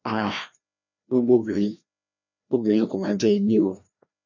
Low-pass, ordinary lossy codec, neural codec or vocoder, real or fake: 7.2 kHz; none; codec, 16 kHz, 1 kbps, FreqCodec, larger model; fake